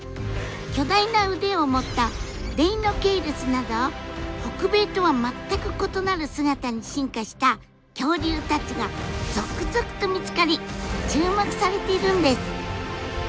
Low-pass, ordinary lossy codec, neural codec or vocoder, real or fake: none; none; none; real